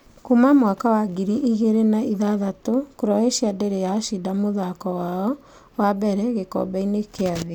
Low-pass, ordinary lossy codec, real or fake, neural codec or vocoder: 19.8 kHz; none; real; none